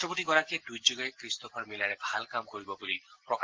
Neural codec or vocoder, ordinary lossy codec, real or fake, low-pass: none; Opus, 16 kbps; real; 7.2 kHz